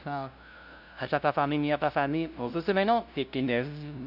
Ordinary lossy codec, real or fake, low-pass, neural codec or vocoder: none; fake; 5.4 kHz; codec, 16 kHz, 0.5 kbps, FunCodec, trained on LibriTTS, 25 frames a second